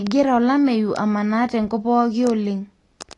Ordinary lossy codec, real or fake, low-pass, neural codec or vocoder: AAC, 32 kbps; real; 10.8 kHz; none